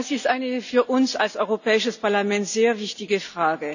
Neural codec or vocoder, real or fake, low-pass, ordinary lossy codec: none; real; 7.2 kHz; none